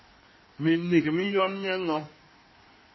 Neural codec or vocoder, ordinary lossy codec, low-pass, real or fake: codec, 16 kHz in and 24 kHz out, 1.1 kbps, FireRedTTS-2 codec; MP3, 24 kbps; 7.2 kHz; fake